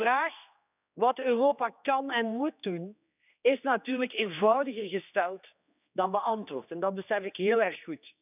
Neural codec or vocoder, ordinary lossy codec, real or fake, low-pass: codec, 16 kHz, 2 kbps, X-Codec, HuBERT features, trained on general audio; none; fake; 3.6 kHz